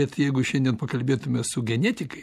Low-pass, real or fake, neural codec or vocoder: 14.4 kHz; real; none